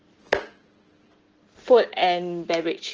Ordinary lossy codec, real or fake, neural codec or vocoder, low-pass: Opus, 16 kbps; real; none; 7.2 kHz